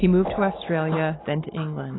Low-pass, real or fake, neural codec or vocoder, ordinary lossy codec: 7.2 kHz; real; none; AAC, 16 kbps